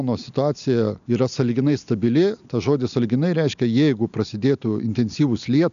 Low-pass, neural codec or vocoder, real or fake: 7.2 kHz; none; real